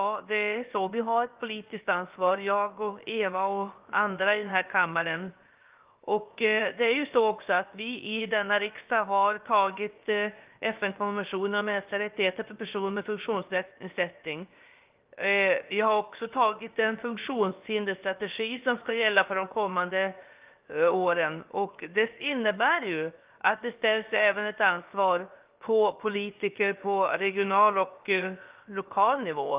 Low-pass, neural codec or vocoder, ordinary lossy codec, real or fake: 3.6 kHz; codec, 16 kHz, 0.7 kbps, FocalCodec; Opus, 24 kbps; fake